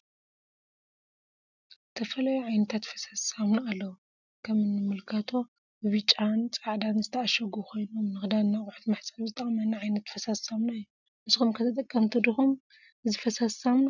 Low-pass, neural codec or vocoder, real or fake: 7.2 kHz; none; real